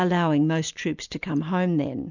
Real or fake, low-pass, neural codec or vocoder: real; 7.2 kHz; none